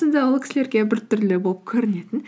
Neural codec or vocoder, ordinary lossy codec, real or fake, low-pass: none; none; real; none